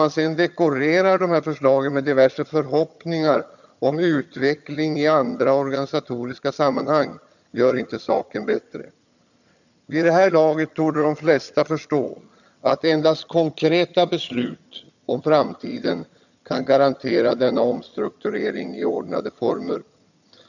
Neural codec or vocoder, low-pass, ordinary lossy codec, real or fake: vocoder, 22.05 kHz, 80 mel bands, HiFi-GAN; 7.2 kHz; none; fake